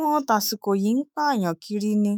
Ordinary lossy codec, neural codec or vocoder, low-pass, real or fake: none; autoencoder, 48 kHz, 128 numbers a frame, DAC-VAE, trained on Japanese speech; none; fake